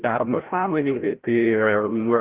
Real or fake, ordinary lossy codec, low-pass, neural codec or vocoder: fake; Opus, 16 kbps; 3.6 kHz; codec, 16 kHz, 0.5 kbps, FreqCodec, larger model